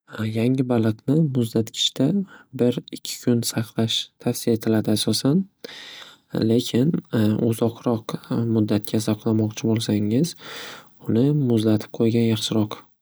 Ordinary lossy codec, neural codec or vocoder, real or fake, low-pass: none; none; real; none